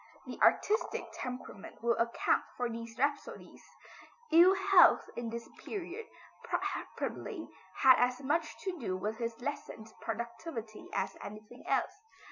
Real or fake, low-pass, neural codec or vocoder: real; 7.2 kHz; none